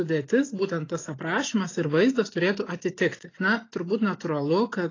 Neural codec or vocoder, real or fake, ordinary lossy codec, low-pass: none; real; AAC, 32 kbps; 7.2 kHz